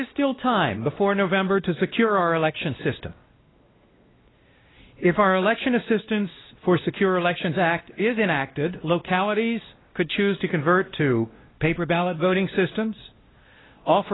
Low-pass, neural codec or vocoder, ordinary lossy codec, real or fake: 7.2 kHz; codec, 16 kHz, 1 kbps, X-Codec, WavLM features, trained on Multilingual LibriSpeech; AAC, 16 kbps; fake